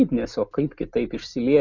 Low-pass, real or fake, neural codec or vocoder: 7.2 kHz; real; none